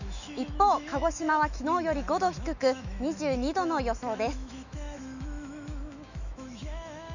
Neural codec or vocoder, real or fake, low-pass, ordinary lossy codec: autoencoder, 48 kHz, 128 numbers a frame, DAC-VAE, trained on Japanese speech; fake; 7.2 kHz; none